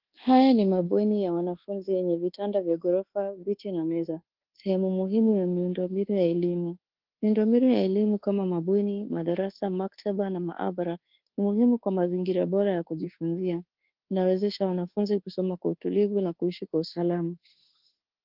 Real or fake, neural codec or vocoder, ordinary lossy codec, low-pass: fake; codec, 24 kHz, 1.2 kbps, DualCodec; Opus, 16 kbps; 5.4 kHz